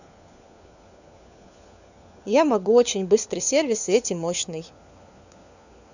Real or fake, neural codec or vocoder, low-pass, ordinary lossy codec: fake; codec, 16 kHz, 4 kbps, FunCodec, trained on LibriTTS, 50 frames a second; 7.2 kHz; none